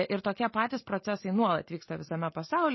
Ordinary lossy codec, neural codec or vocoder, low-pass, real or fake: MP3, 24 kbps; none; 7.2 kHz; real